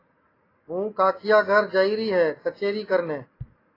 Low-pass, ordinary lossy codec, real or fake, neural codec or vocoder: 5.4 kHz; AAC, 24 kbps; real; none